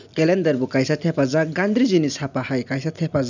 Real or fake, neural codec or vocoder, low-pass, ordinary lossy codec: real; none; 7.2 kHz; none